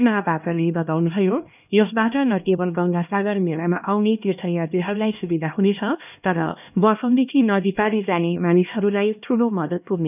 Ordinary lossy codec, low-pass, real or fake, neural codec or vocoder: none; 3.6 kHz; fake; codec, 16 kHz, 1 kbps, X-Codec, HuBERT features, trained on LibriSpeech